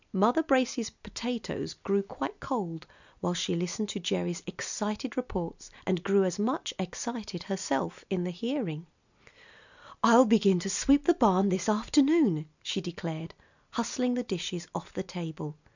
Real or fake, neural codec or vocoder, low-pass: real; none; 7.2 kHz